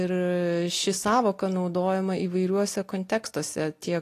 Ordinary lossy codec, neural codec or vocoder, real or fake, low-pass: AAC, 48 kbps; none; real; 14.4 kHz